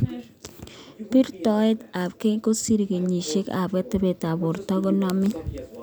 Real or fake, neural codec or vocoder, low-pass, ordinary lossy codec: real; none; none; none